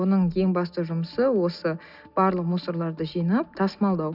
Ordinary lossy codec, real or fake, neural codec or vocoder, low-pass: none; real; none; 5.4 kHz